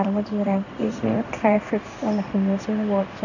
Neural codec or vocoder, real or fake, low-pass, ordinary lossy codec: codec, 16 kHz in and 24 kHz out, 1 kbps, XY-Tokenizer; fake; 7.2 kHz; none